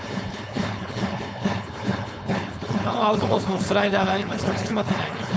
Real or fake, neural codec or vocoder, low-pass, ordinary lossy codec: fake; codec, 16 kHz, 4.8 kbps, FACodec; none; none